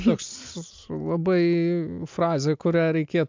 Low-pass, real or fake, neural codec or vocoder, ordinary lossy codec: 7.2 kHz; real; none; MP3, 64 kbps